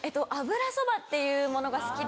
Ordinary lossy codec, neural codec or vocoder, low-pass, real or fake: none; none; none; real